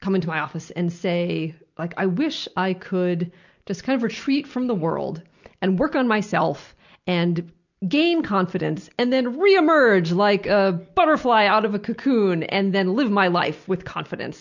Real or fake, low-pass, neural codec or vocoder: real; 7.2 kHz; none